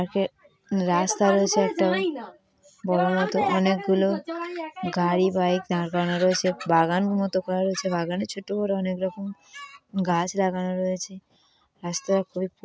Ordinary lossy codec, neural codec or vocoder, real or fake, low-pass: none; none; real; none